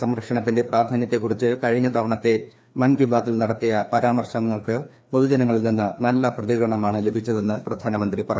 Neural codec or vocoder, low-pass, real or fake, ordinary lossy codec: codec, 16 kHz, 2 kbps, FreqCodec, larger model; none; fake; none